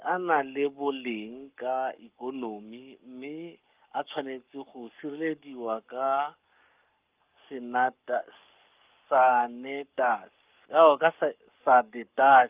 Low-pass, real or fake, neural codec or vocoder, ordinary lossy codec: 3.6 kHz; fake; codec, 44.1 kHz, 7.8 kbps, Pupu-Codec; Opus, 24 kbps